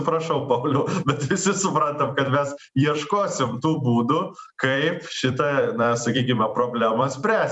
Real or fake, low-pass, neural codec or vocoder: fake; 10.8 kHz; vocoder, 44.1 kHz, 128 mel bands every 256 samples, BigVGAN v2